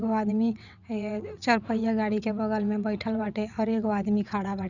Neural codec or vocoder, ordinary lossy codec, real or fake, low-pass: vocoder, 22.05 kHz, 80 mel bands, WaveNeXt; none; fake; 7.2 kHz